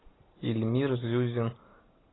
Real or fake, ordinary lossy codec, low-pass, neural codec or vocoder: real; AAC, 16 kbps; 7.2 kHz; none